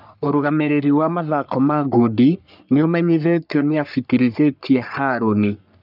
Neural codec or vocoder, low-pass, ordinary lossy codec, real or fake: codec, 44.1 kHz, 3.4 kbps, Pupu-Codec; 5.4 kHz; none; fake